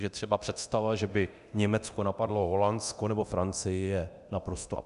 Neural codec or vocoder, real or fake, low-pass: codec, 24 kHz, 0.9 kbps, DualCodec; fake; 10.8 kHz